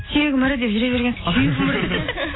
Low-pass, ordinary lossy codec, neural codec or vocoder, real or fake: 7.2 kHz; AAC, 16 kbps; none; real